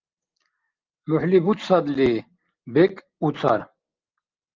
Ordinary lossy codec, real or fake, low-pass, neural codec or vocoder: Opus, 32 kbps; real; 7.2 kHz; none